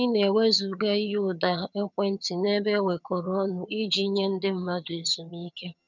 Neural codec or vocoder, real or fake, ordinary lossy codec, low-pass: vocoder, 22.05 kHz, 80 mel bands, HiFi-GAN; fake; none; 7.2 kHz